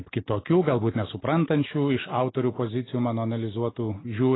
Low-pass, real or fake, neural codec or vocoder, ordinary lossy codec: 7.2 kHz; real; none; AAC, 16 kbps